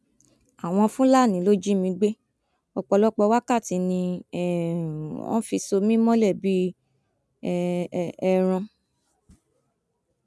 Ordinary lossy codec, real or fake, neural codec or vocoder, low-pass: none; real; none; none